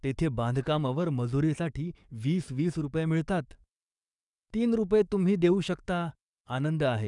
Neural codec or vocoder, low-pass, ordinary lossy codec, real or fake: codec, 44.1 kHz, 7.8 kbps, DAC; 10.8 kHz; none; fake